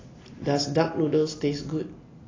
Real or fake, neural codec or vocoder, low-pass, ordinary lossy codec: real; none; 7.2 kHz; AAC, 32 kbps